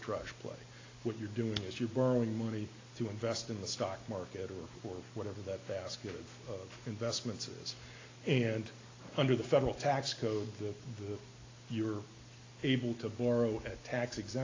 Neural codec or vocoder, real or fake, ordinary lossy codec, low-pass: none; real; AAC, 32 kbps; 7.2 kHz